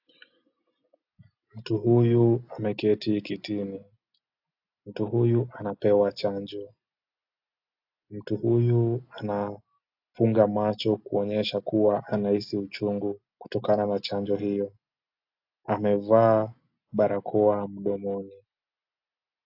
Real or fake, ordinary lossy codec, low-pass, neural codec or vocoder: real; AAC, 48 kbps; 5.4 kHz; none